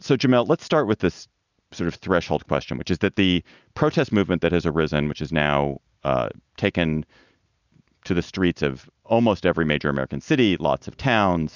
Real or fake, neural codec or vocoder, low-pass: real; none; 7.2 kHz